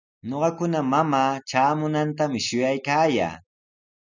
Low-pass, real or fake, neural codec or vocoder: 7.2 kHz; real; none